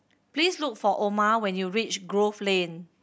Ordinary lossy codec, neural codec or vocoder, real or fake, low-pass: none; none; real; none